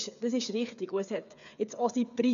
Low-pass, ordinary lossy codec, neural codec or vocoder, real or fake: 7.2 kHz; none; codec, 16 kHz, 8 kbps, FreqCodec, smaller model; fake